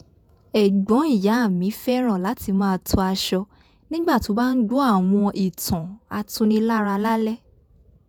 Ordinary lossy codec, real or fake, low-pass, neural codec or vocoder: none; fake; none; vocoder, 48 kHz, 128 mel bands, Vocos